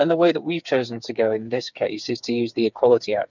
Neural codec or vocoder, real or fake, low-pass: codec, 16 kHz, 4 kbps, FreqCodec, smaller model; fake; 7.2 kHz